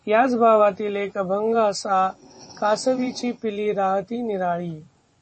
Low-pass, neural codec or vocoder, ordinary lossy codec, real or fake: 10.8 kHz; vocoder, 24 kHz, 100 mel bands, Vocos; MP3, 32 kbps; fake